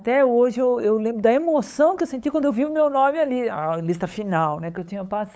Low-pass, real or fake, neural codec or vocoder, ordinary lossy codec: none; fake; codec, 16 kHz, 8 kbps, FunCodec, trained on LibriTTS, 25 frames a second; none